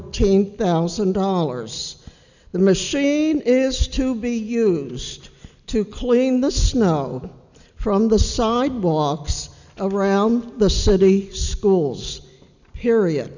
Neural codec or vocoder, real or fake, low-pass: none; real; 7.2 kHz